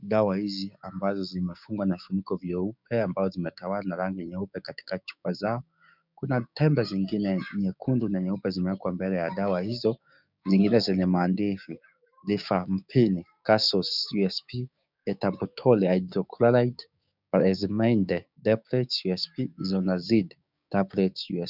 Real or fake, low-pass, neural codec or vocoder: fake; 5.4 kHz; codec, 16 kHz, 6 kbps, DAC